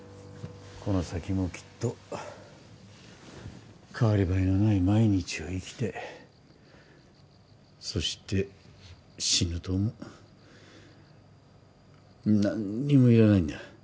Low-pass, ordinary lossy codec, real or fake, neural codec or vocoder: none; none; real; none